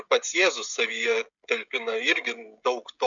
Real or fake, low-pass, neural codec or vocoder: fake; 7.2 kHz; codec, 16 kHz, 16 kbps, FreqCodec, smaller model